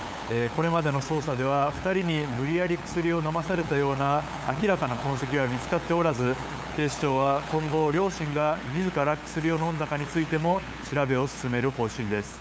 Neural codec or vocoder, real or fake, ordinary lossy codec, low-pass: codec, 16 kHz, 8 kbps, FunCodec, trained on LibriTTS, 25 frames a second; fake; none; none